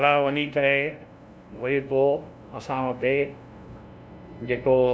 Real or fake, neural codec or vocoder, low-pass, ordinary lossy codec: fake; codec, 16 kHz, 0.5 kbps, FunCodec, trained on LibriTTS, 25 frames a second; none; none